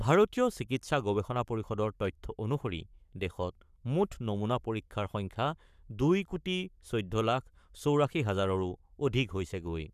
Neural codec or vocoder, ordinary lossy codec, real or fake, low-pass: codec, 44.1 kHz, 7.8 kbps, Pupu-Codec; none; fake; 14.4 kHz